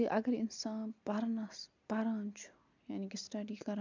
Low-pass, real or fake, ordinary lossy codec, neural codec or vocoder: 7.2 kHz; real; MP3, 64 kbps; none